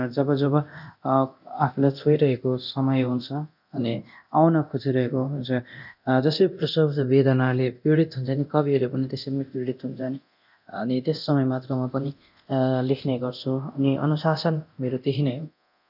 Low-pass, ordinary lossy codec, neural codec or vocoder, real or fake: 5.4 kHz; none; codec, 24 kHz, 0.9 kbps, DualCodec; fake